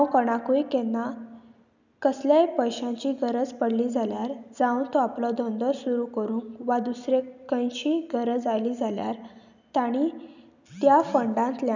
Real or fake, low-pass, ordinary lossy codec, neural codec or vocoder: real; 7.2 kHz; none; none